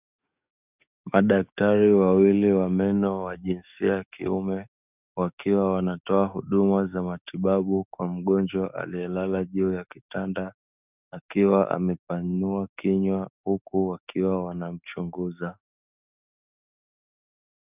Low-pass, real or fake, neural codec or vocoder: 3.6 kHz; fake; codec, 44.1 kHz, 7.8 kbps, DAC